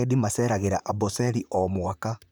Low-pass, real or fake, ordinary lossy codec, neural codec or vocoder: none; fake; none; vocoder, 44.1 kHz, 128 mel bands, Pupu-Vocoder